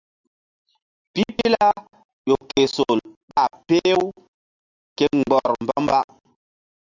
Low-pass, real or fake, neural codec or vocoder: 7.2 kHz; real; none